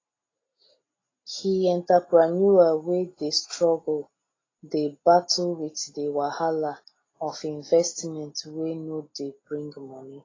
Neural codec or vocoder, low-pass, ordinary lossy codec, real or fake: none; 7.2 kHz; AAC, 32 kbps; real